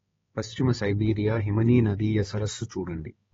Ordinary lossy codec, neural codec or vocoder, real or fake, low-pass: AAC, 24 kbps; codec, 16 kHz, 4 kbps, X-Codec, HuBERT features, trained on balanced general audio; fake; 7.2 kHz